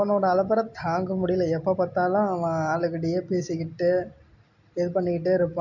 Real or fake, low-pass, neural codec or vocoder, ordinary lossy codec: real; 7.2 kHz; none; none